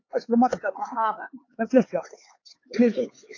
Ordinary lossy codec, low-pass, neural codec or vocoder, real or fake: AAC, 32 kbps; 7.2 kHz; codec, 16 kHz, 4 kbps, X-Codec, HuBERT features, trained on LibriSpeech; fake